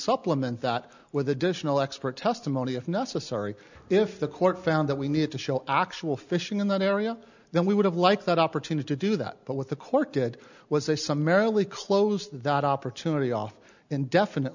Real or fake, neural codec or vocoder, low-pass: real; none; 7.2 kHz